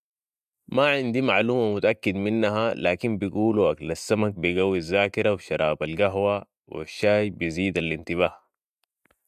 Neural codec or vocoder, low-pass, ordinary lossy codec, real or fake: none; 14.4 kHz; MP3, 96 kbps; real